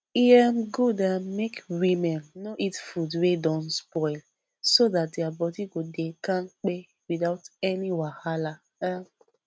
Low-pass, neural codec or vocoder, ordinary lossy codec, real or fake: none; none; none; real